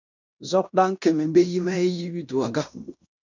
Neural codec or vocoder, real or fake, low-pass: codec, 16 kHz in and 24 kHz out, 0.9 kbps, LongCat-Audio-Codec, fine tuned four codebook decoder; fake; 7.2 kHz